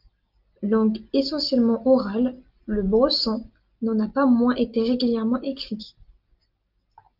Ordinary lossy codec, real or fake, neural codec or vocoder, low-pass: Opus, 24 kbps; real; none; 5.4 kHz